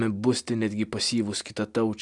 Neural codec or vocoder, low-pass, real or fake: none; 10.8 kHz; real